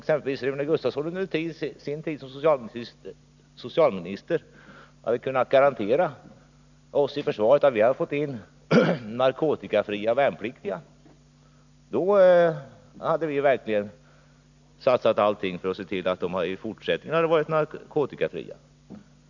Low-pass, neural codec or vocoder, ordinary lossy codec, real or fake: 7.2 kHz; none; none; real